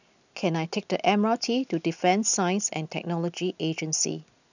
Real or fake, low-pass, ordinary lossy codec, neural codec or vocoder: real; 7.2 kHz; none; none